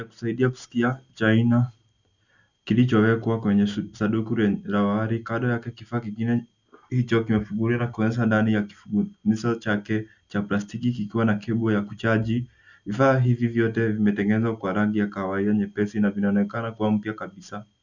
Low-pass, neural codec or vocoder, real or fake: 7.2 kHz; none; real